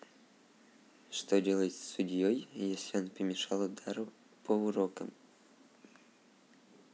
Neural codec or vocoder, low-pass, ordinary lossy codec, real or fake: none; none; none; real